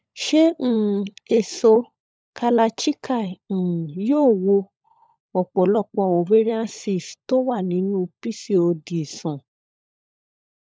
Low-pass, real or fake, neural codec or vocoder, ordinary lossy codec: none; fake; codec, 16 kHz, 16 kbps, FunCodec, trained on LibriTTS, 50 frames a second; none